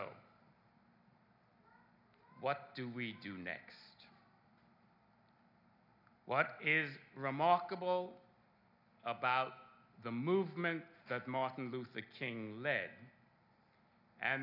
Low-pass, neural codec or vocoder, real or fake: 5.4 kHz; none; real